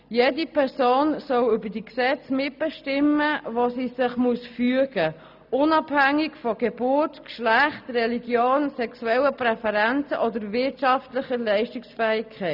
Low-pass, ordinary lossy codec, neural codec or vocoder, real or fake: 5.4 kHz; none; none; real